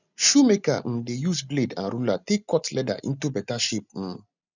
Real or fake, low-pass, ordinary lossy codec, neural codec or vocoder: real; 7.2 kHz; none; none